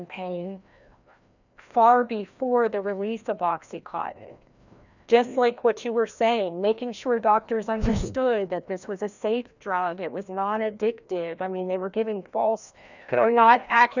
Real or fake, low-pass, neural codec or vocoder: fake; 7.2 kHz; codec, 16 kHz, 1 kbps, FreqCodec, larger model